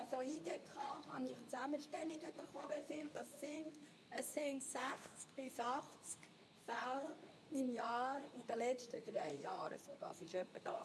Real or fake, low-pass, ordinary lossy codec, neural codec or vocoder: fake; none; none; codec, 24 kHz, 0.9 kbps, WavTokenizer, medium speech release version 2